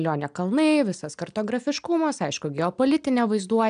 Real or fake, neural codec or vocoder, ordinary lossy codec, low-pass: real; none; AAC, 96 kbps; 9.9 kHz